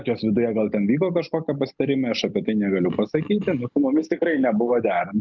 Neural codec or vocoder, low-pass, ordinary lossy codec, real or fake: none; 7.2 kHz; Opus, 24 kbps; real